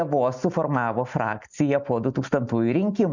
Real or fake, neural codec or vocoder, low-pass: real; none; 7.2 kHz